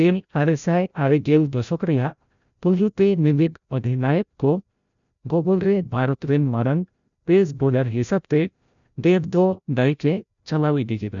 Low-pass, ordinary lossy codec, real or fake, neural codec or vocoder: 7.2 kHz; none; fake; codec, 16 kHz, 0.5 kbps, FreqCodec, larger model